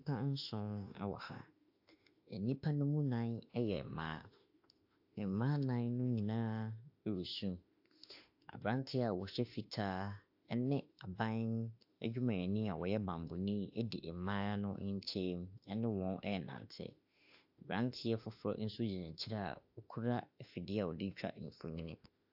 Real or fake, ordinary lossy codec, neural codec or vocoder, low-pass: fake; AAC, 48 kbps; autoencoder, 48 kHz, 32 numbers a frame, DAC-VAE, trained on Japanese speech; 5.4 kHz